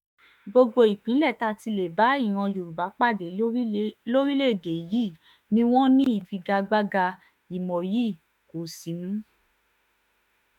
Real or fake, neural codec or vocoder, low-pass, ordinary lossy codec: fake; autoencoder, 48 kHz, 32 numbers a frame, DAC-VAE, trained on Japanese speech; 19.8 kHz; MP3, 96 kbps